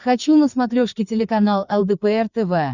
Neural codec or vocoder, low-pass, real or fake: codec, 16 kHz, 4 kbps, FreqCodec, larger model; 7.2 kHz; fake